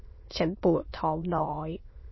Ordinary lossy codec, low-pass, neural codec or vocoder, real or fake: MP3, 24 kbps; 7.2 kHz; autoencoder, 22.05 kHz, a latent of 192 numbers a frame, VITS, trained on many speakers; fake